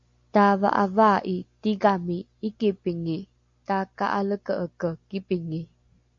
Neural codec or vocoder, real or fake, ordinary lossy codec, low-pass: none; real; MP3, 48 kbps; 7.2 kHz